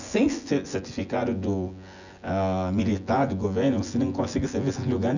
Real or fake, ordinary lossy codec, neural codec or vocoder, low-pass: fake; none; vocoder, 24 kHz, 100 mel bands, Vocos; 7.2 kHz